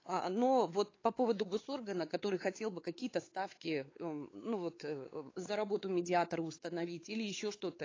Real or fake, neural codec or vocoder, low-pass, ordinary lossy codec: fake; codec, 16 kHz, 4 kbps, X-Codec, WavLM features, trained on Multilingual LibriSpeech; 7.2 kHz; AAC, 32 kbps